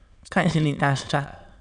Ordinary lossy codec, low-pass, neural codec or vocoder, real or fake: none; 9.9 kHz; autoencoder, 22.05 kHz, a latent of 192 numbers a frame, VITS, trained on many speakers; fake